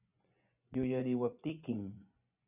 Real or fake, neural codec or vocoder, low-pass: fake; vocoder, 24 kHz, 100 mel bands, Vocos; 3.6 kHz